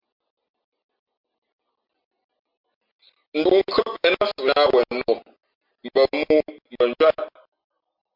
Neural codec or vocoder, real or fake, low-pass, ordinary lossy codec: none; real; 5.4 kHz; MP3, 48 kbps